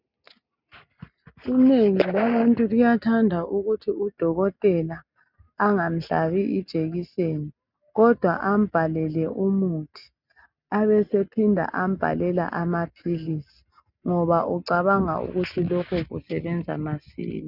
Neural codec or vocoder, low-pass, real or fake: none; 5.4 kHz; real